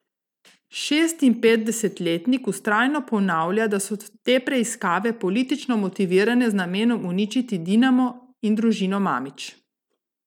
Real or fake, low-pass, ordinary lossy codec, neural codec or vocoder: real; 19.8 kHz; none; none